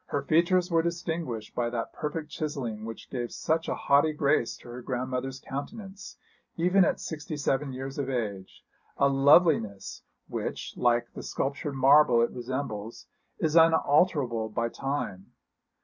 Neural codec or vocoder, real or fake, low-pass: none; real; 7.2 kHz